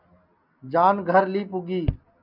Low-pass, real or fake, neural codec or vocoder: 5.4 kHz; real; none